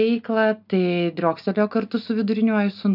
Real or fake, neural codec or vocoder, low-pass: real; none; 5.4 kHz